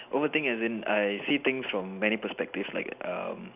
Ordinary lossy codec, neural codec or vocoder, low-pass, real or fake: none; none; 3.6 kHz; real